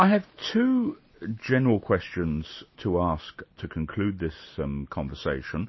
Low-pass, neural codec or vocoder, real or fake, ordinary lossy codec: 7.2 kHz; none; real; MP3, 24 kbps